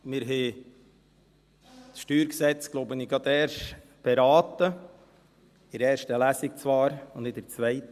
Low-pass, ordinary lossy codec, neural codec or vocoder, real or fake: 14.4 kHz; Opus, 64 kbps; none; real